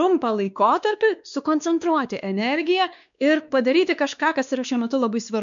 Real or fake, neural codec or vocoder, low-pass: fake; codec, 16 kHz, 2 kbps, X-Codec, WavLM features, trained on Multilingual LibriSpeech; 7.2 kHz